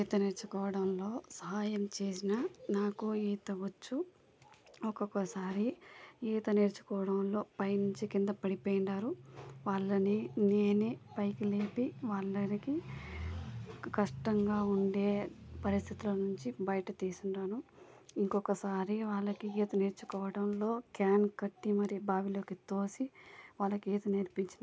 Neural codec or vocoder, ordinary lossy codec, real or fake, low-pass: none; none; real; none